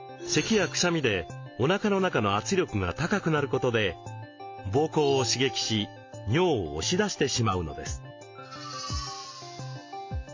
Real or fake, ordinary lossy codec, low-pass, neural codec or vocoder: real; MP3, 48 kbps; 7.2 kHz; none